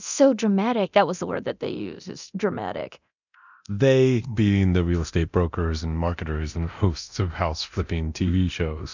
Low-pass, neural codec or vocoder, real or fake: 7.2 kHz; codec, 24 kHz, 0.9 kbps, DualCodec; fake